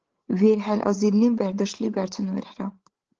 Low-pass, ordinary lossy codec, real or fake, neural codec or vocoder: 7.2 kHz; Opus, 16 kbps; fake; codec, 16 kHz, 8 kbps, FreqCodec, larger model